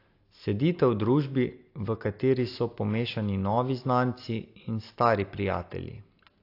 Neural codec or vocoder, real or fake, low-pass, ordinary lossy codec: none; real; 5.4 kHz; AAC, 32 kbps